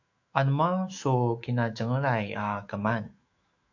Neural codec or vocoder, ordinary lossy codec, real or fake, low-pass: autoencoder, 48 kHz, 128 numbers a frame, DAC-VAE, trained on Japanese speech; AAC, 48 kbps; fake; 7.2 kHz